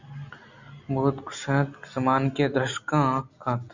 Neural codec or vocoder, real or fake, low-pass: none; real; 7.2 kHz